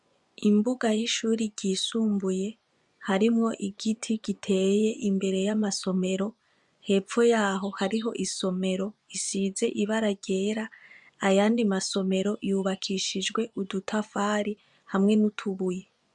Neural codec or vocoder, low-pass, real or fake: vocoder, 24 kHz, 100 mel bands, Vocos; 10.8 kHz; fake